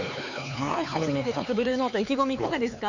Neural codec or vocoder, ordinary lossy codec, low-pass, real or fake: codec, 16 kHz, 4 kbps, X-Codec, HuBERT features, trained on LibriSpeech; none; 7.2 kHz; fake